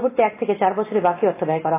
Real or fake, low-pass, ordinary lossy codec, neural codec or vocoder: real; 3.6 kHz; AAC, 16 kbps; none